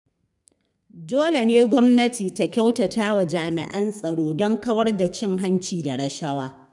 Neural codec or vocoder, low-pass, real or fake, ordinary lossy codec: codec, 32 kHz, 1.9 kbps, SNAC; 10.8 kHz; fake; none